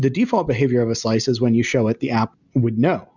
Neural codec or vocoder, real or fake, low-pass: none; real; 7.2 kHz